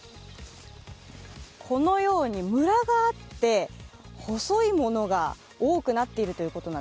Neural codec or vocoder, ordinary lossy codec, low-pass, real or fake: none; none; none; real